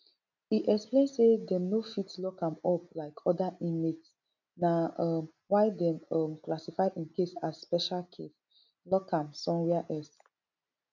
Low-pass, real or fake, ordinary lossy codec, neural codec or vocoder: 7.2 kHz; real; none; none